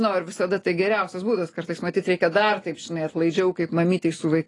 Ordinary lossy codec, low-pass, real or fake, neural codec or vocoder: AAC, 32 kbps; 10.8 kHz; real; none